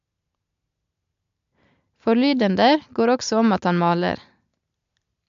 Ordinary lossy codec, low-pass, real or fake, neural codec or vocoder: MP3, 64 kbps; 7.2 kHz; real; none